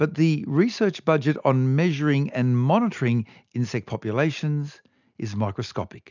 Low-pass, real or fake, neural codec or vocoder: 7.2 kHz; real; none